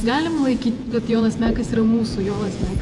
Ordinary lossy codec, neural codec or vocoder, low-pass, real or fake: AAC, 64 kbps; vocoder, 48 kHz, 128 mel bands, Vocos; 10.8 kHz; fake